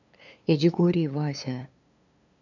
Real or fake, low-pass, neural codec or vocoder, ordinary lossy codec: fake; 7.2 kHz; codec, 16 kHz, 2 kbps, FunCodec, trained on LibriTTS, 25 frames a second; none